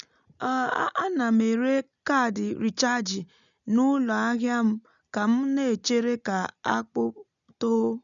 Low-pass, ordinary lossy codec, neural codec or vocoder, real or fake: 7.2 kHz; none; none; real